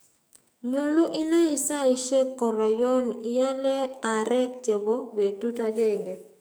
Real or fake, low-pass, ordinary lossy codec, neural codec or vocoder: fake; none; none; codec, 44.1 kHz, 2.6 kbps, SNAC